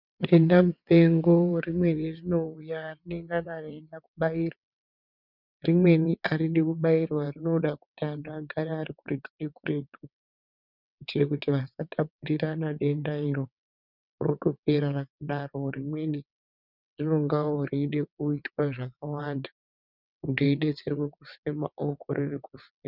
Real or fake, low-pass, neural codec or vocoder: fake; 5.4 kHz; vocoder, 22.05 kHz, 80 mel bands, WaveNeXt